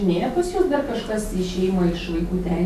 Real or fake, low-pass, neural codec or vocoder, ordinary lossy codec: real; 14.4 kHz; none; AAC, 96 kbps